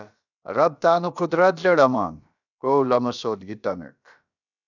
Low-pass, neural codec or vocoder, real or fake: 7.2 kHz; codec, 16 kHz, about 1 kbps, DyCAST, with the encoder's durations; fake